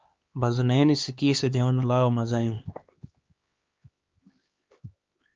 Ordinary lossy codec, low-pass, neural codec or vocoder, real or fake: Opus, 24 kbps; 7.2 kHz; codec, 16 kHz, 2 kbps, X-Codec, HuBERT features, trained on LibriSpeech; fake